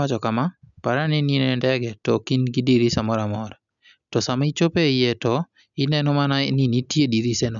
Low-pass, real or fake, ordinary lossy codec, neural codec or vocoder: 7.2 kHz; real; none; none